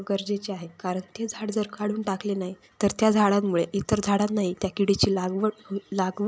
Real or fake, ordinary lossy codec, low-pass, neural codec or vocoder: real; none; none; none